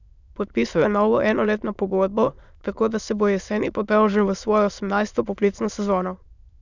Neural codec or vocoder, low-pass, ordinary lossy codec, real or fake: autoencoder, 22.05 kHz, a latent of 192 numbers a frame, VITS, trained on many speakers; 7.2 kHz; none; fake